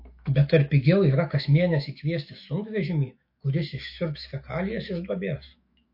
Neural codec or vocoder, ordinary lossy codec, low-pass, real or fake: autoencoder, 48 kHz, 128 numbers a frame, DAC-VAE, trained on Japanese speech; MP3, 32 kbps; 5.4 kHz; fake